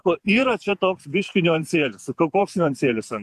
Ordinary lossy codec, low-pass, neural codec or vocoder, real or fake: AAC, 96 kbps; 14.4 kHz; vocoder, 48 kHz, 128 mel bands, Vocos; fake